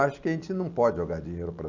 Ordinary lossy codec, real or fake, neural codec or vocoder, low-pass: none; real; none; 7.2 kHz